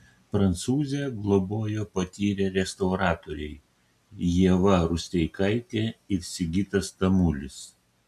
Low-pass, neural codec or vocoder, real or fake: 14.4 kHz; none; real